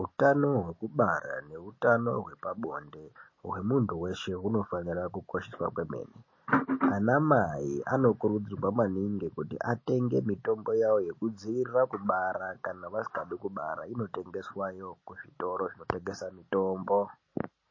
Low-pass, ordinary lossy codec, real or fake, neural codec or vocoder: 7.2 kHz; MP3, 32 kbps; real; none